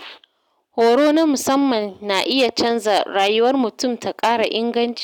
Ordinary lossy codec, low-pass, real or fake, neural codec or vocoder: none; 19.8 kHz; real; none